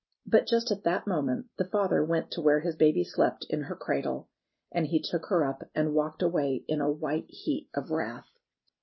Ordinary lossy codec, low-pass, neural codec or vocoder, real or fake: MP3, 24 kbps; 7.2 kHz; none; real